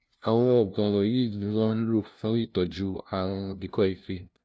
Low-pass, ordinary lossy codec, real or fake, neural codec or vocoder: none; none; fake; codec, 16 kHz, 0.5 kbps, FunCodec, trained on LibriTTS, 25 frames a second